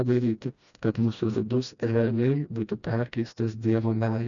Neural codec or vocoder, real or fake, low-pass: codec, 16 kHz, 1 kbps, FreqCodec, smaller model; fake; 7.2 kHz